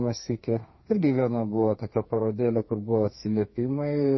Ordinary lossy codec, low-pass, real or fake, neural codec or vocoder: MP3, 24 kbps; 7.2 kHz; fake; codec, 32 kHz, 1.9 kbps, SNAC